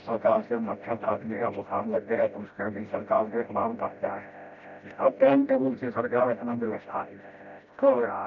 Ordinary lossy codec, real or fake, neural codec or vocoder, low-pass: none; fake; codec, 16 kHz, 0.5 kbps, FreqCodec, smaller model; 7.2 kHz